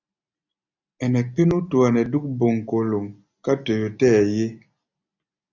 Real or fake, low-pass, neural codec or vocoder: real; 7.2 kHz; none